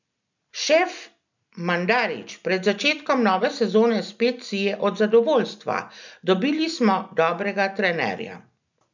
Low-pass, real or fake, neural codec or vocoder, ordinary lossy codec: 7.2 kHz; real; none; none